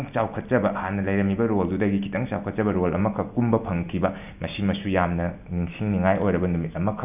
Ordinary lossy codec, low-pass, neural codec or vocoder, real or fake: none; 3.6 kHz; none; real